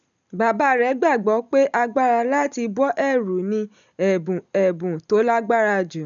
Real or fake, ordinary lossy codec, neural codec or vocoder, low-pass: real; none; none; 7.2 kHz